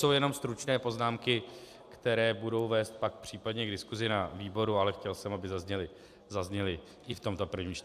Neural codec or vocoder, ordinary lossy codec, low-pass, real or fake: none; AAC, 96 kbps; 14.4 kHz; real